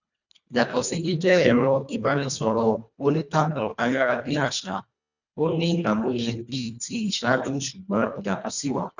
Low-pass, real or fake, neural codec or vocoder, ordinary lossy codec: 7.2 kHz; fake; codec, 24 kHz, 1.5 kbps, HILCodec; none